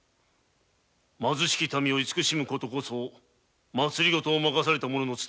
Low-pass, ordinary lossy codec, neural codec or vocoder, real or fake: none; none; none; real